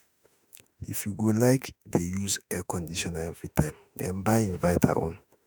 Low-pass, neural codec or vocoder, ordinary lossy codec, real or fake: none; autoencoder, 48 kHz, 32 numbers a frame, DAC-VAE, trained on Japanese speech; none; fake